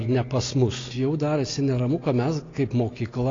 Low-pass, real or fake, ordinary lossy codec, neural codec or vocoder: 7.2 kHz; real; AAC, 32 kbps; none